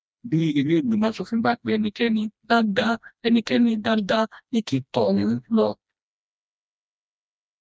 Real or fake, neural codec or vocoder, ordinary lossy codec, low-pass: fake; codec, 16 kHz, 1 kbps, FreqCodec, smaller model; none; none